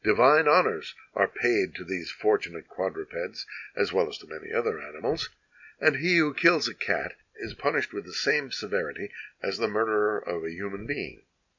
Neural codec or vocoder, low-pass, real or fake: none; 7.2 kHz; real